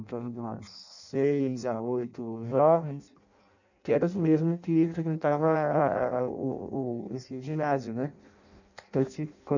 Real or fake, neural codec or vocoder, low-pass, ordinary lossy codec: fake; codec, 16 kHz in and 24 kHz out, 0.6 kbps, FireRedTTS-2 codec; 7.2 kHz; none